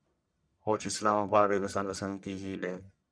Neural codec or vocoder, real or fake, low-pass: codec, 44.1 kHz, 1.7 kbps, Pupu-Codec; fake; 9.9 kHz